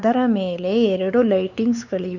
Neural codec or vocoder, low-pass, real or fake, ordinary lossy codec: codec, 16 kHz, 4 kbps, X-Codec, WavLM features, trained on Multilingual LibriSpeech; 7.2 kHz; fake; none